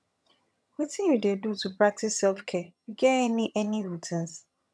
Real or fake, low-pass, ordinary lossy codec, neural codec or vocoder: fake; none; none; vocoder, 22.05 kHz, 80 mel bands, HiFi-GAN